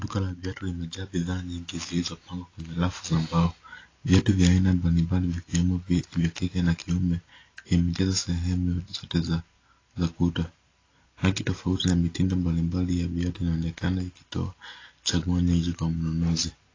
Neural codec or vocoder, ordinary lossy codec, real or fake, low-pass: none; AAC, 32 kbps; real; 7.2 kHz